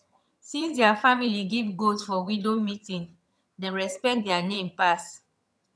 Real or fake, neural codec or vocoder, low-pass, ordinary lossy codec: fake; vocoder, 22.05 kHz, 80 mel bands, HiFi-GAN; none; none